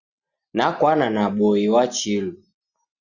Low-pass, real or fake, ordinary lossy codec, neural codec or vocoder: 7.2 kHz; real; Opus, 64 kbps; none